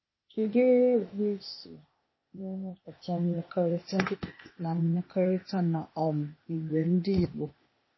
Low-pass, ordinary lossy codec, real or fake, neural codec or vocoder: 7.2 kHz; MP3, 24 kbps; fake; codec, 16 kHz, 0.8 kbps, ZipCodec